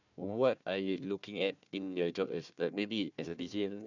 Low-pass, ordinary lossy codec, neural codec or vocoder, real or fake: 7.2 kHz; none; codec, 16 kHz, 1 kbps, FunCodec, trained on Chinese and English, 50 frames a second; fake